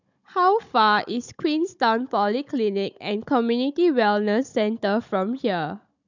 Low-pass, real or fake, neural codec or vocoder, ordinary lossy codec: 7.2 kHz; fake; codec, 16 kHz, 16 kbps, FunCodec, trained on Chinese and English, 50 frames a second; none